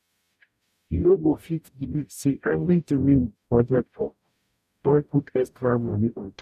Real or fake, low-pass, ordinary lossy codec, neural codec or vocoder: fake; 14.4 kHz; none; codec, 44.1 kHz, 0.9 kbps, DAC